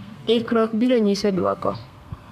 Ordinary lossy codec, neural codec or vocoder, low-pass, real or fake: none; codec, 32 kHz, 1.9 kbps, SNAC; 14.4 kHz; fake